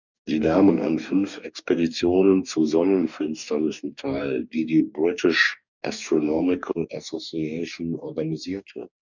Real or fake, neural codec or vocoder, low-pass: fake; codec, 44.1 kHz, 2.6 kbps, DAC; 7.2 kHz